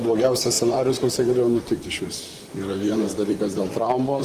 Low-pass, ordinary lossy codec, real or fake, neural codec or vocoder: 14.4 kHz; Opus, 24 kbps; fake; vocoder, 44.1 kHz, 128 mel bands, Pupu-Vocoder